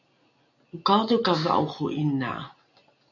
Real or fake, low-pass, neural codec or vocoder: real; 7.2 kHz; none